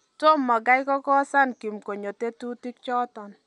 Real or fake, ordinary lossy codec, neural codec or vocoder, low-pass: real; none; none; 10.8 kHz